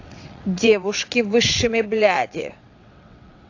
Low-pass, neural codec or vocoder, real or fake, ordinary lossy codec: 7.2 kHz; vocoder, 22.05 kHz, 80 mel bands, WaveNeXt; fake; AAC, 48 kbps